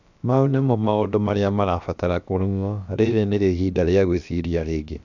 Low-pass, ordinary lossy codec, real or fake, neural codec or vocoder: 7.2 kHz; none; fake; codec, 16 kHz, about 1 kbps, DyCAST, with the encoder's durations